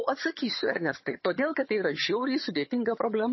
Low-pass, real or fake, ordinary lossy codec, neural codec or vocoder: 7.2 kHz; fake; MP3, 24 kbps; vocoder, 22.05 kHz, 80 mel bands, HiFi-GAN